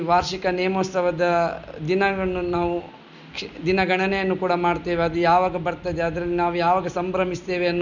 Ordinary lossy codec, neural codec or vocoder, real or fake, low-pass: none; none; real; 7.2 kHz